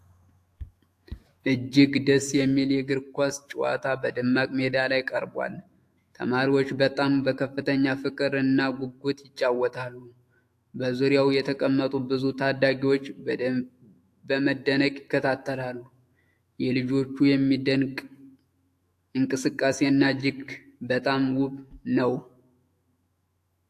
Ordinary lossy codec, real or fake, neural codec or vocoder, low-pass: AAC, 64 kbps; fake; autoencoder, 48 kHz, 128 numbers a frame, DAC-VAE, trained on Japanese speech; 14.4 kHz